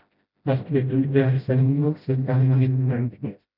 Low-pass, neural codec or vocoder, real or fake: 5.4 kHz; codec, 16 kHz, 0.5 kbps, FreqCodec, smaller model; fake